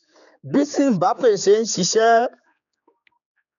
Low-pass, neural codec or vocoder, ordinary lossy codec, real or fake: 7.2 kHz; codec, 16 kHz, 4 kbps, X-Codec, HuBERT features, trained on general audio; none; fake